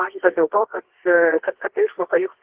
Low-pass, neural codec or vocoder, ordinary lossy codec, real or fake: 3.6 kHz; codec, 24 kHz, 0.9 kbps, WavTokenizer, medium music audio release; Opus, 16 kbps; fake